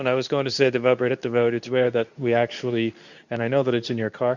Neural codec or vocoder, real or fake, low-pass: codec, 24 kHz, 0.9 kbps, WavTokenizer, medium speech release version 2; fake; 7.2 kHz